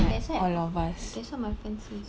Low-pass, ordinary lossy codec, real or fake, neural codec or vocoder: none; none; real; none